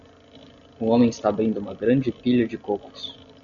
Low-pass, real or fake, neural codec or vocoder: 7.2 kHz; real; none